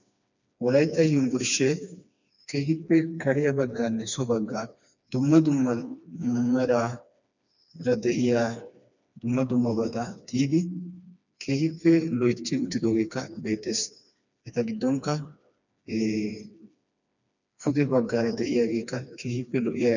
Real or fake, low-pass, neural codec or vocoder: fake; 7.2 kHz; codec, 16 kHz, 2 kbps, FreqCodec, smaller model